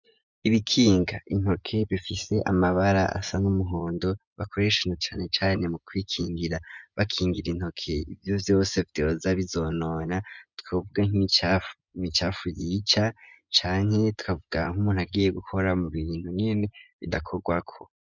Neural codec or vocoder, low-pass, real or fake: vocoder, 24 kHz, 100 mel bands, Vocos; 7.2 kHz; fake